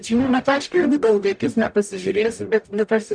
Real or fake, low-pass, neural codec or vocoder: fake; 9.9 kHz; codec, 44.1 kHz, 0.9 kbps, DAC